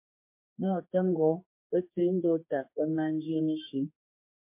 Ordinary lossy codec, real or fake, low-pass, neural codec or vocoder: MP3, 24 kbps; fake; 3.6 kHz; codec, 16 kHz, 2 kbps, X-Codec, HuBERT features, trained on general audio